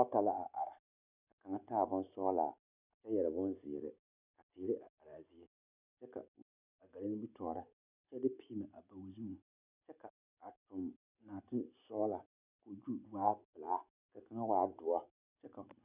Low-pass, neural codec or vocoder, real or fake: 3.6 kHz; none; real